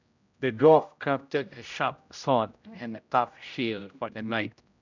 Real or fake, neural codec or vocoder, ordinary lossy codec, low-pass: fake; codec, 16 kHz, 0.5 kbps, X-Codec, HuBERT features, trained on general audio; none; 7.2 kHz